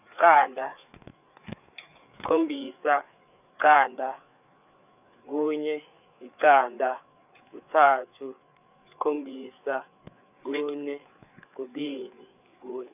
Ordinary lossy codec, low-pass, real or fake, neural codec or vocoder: none; 3.6 kHz; fake; codec, 16 kHz, 4 kbps, FreqCodec, larger model